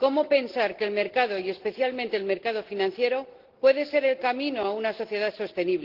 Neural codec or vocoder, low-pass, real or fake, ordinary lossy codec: none; 5.4 kHz; real; Opus, 16 kbps